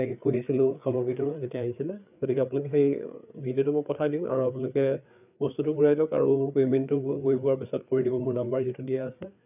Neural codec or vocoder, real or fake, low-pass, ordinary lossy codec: codec, 16 kHz, 4 kbps, FreqCodec, larger model; fake; 3.6 kHz; none